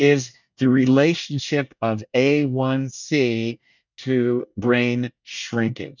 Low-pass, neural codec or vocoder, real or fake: 7.2 kHz; codec, 24 kHz, 1 kbps, SNAC; fake